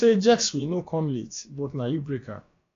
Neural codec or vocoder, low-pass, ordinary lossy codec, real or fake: codec, 16 kHz, about 1 kbps, DyCAST, with the encoder's durations; 7.2 kHz; AAC, 48 kbps; fake